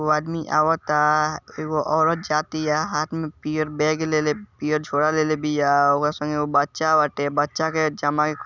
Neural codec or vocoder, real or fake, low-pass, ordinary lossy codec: none; real; 7.2 kHz; Opus, 64 kbps